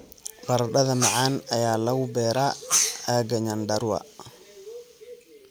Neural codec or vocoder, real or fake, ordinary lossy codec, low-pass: none; real; none; none